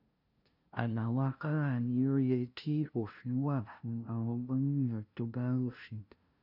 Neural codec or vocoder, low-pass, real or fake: codec, 16 kHz, 0.5 kbps, FunCodec, trained on LibriTTS, 25 frames a second; 5.4 kHz; fake